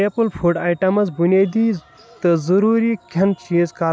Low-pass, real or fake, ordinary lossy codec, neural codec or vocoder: none; real; none; none